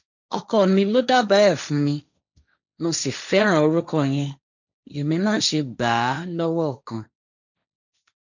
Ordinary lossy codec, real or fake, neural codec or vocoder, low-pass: none; fake; codec, 16 kHz, 1.1 kbps, Voila-Tokenizer; 7.2 kHz